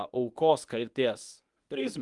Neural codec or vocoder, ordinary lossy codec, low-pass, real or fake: codec, 24 kHz, 0.9 kbps, WavTokenizer, medium speech release version 1; Opus, 32 kbps; 10.8 kHz; fake